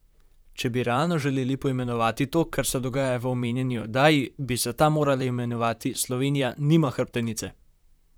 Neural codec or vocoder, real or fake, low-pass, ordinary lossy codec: vocoder, 44.1 kHz, 128 mel bands, Pupu-Vocoder; fake; none; none